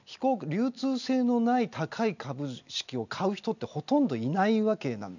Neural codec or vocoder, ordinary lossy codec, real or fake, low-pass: none; none; real; 7.2 kHz